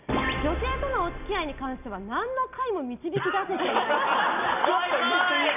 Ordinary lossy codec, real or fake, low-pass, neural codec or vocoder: none; real; 3.6 kHz; none